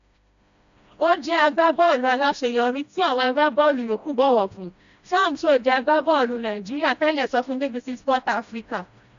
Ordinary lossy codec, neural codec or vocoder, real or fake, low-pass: MP3, 64 kbps; codec, 16 kHz, 1 kbps, FreqCodec, smaller model; fake; 7.2 kHz